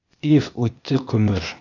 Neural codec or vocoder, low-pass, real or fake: codec, 16 kHz, 0.8 kbps, ZipCodec; 7.2 kHz; fake